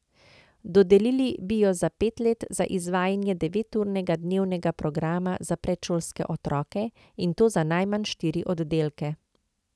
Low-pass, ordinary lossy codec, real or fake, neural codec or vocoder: none; none; real; none